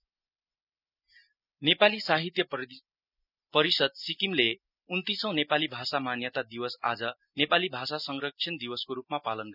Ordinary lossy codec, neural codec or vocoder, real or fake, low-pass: none; none; real; 5.4 kHz